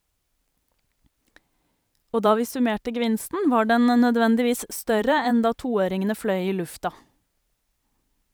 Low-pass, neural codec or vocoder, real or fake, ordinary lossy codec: none; vocoder, 44.1 kHz, 128 mel bands every 512 samples, BigVGAN v2; fake; none